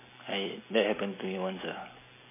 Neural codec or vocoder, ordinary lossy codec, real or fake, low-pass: none; MP3, 16 kbps; real; 3.6 kHz